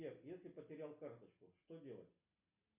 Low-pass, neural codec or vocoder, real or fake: 3.6 kHz; none; real